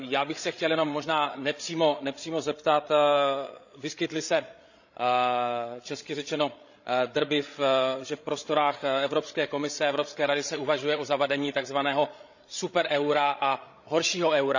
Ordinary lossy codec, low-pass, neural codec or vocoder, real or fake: none; 7.2 kHz; codec, 16 kHz, 16 kbps, FreqCodec, larger model; fake